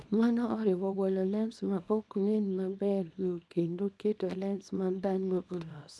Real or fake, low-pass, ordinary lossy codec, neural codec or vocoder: fake; none; none; codec, 24 kHz, 0.9 kbps, WavTokenizer, small release